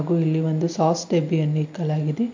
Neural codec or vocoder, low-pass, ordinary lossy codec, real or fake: none; 7.2 kHz; MP3, 48 kbps; real